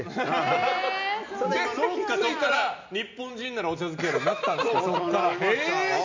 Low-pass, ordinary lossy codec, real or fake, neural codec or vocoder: 7.2 kHz; MP3, 64 kbps; real; none